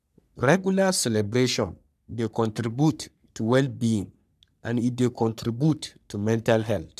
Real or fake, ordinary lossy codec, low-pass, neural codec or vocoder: fake; none; 14.4 kHz; codec, 44.1 kHz, 3.4 kbps, Pupu-Codec